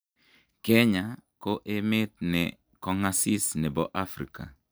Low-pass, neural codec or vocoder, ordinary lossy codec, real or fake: none; none; none; real